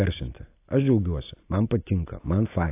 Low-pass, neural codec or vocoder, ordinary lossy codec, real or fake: 3.6 kHz; none; AAC, 24 kbps; real